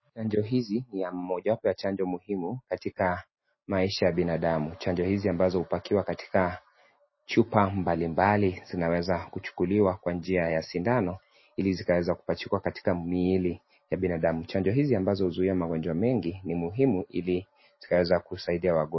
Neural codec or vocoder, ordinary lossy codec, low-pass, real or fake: none; MP3, 24 kbps; 7.2 kHz; real